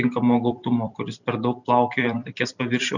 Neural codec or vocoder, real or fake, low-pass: vocoder, 44.1 kHz, 128 mel bands every 256 samples, BigVGAN v2; fake; 7.2 kHz